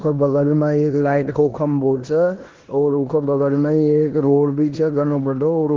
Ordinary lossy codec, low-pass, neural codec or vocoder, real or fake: Opus, 16 kbps; 7.2 kHz; codec, 16 kHz in and 24 kHz out, 0.9 kbps, LongCat-Audio-Codec, four codebook decoder; fake